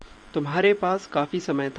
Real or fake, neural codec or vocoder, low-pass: fake; vocoder, 44.1 kHz, 128 mel bands every 512 samples, BigVGAN v2; 9.9 kHz